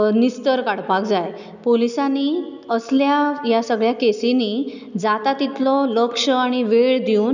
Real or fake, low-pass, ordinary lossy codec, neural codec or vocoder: real; 7.2 kHz; none; none